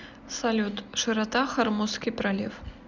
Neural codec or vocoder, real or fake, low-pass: none; real; 7.2 kHz